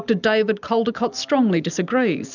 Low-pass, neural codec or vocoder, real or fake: 7.2 kHz; none; real